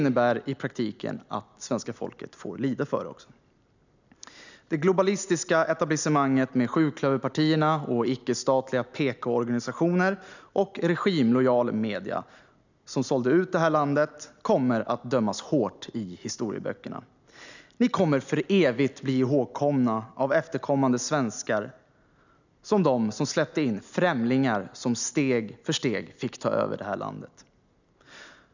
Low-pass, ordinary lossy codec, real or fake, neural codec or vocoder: 7.2 kHz; none; real; none